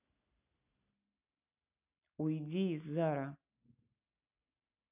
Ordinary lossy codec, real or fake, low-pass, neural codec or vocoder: none; real; 3.6 kHz; none